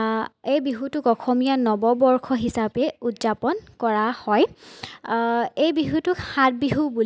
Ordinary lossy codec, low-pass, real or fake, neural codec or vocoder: none; none; real; none